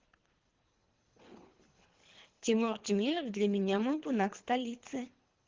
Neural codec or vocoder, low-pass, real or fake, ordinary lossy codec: codec, 24 kHz, 3 kbps, HILCodec; 7.2 kHz; fake; Opus, 16 kbps